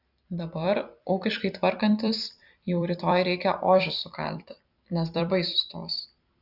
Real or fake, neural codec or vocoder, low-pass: fake; vocoder, 44.1 kHz, 128 mel bands every 256 samples, BigVGAN v2; 5.4 kHz